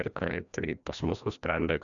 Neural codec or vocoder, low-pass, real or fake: codec, 16 kHz, 1 kbps, FreqCodec, larger model; 7.2 kHz; fake